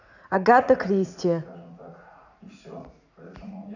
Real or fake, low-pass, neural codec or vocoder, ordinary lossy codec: fake; 7.2 kHz; vocoder, 44.1 kHz, 80 mel bands, Vocos; none